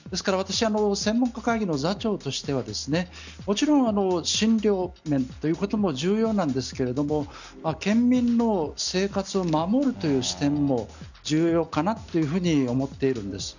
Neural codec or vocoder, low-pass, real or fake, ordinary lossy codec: none; 7.2 kHz; real; none